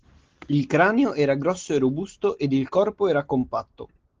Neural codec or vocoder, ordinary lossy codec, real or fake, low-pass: none; Opus, 16 kbps; real; 7.2 kHz